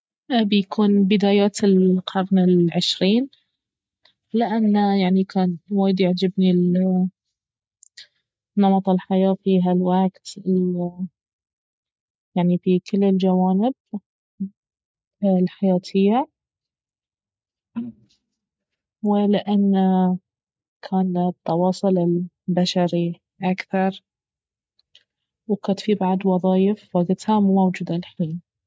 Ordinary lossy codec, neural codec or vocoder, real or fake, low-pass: none; none; real; none